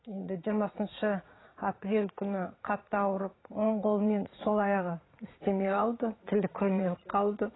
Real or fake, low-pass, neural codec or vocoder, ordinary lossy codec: fake; 7.2 kHz; vocoder, 44.1 kHz, 80 mel bands, Vocos; AAC, 16 kbps